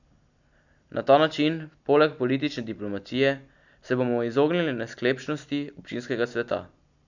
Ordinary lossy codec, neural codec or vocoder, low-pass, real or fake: none; none; 7.2 kHz; real